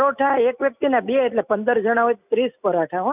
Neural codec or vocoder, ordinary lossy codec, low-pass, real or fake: none; none; 3.6 kHz; real